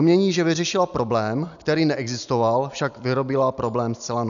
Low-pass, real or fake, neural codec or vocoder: 7.2 kHz; real; none